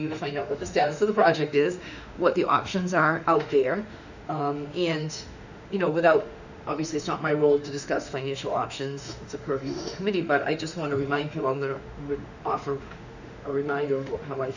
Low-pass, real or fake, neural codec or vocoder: 7.2 kHz; fake; autoencoder, 48 kHz, 32 numbers a frame, DAC-VAE, trained on Japanese speech